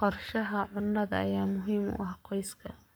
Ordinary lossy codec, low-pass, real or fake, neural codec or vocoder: none; none; fake; codec, 44.1 kHz, 7.8 kbps, Pupu-Codec